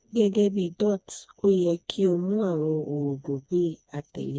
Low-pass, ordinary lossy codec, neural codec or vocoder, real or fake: none; none; codec, 16 kHz, 2 kbps, FreqCodec, smaller model; fake